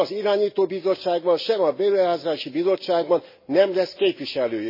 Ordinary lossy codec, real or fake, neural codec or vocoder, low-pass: MP3, 24 kbps; real; none; 5.4 kHz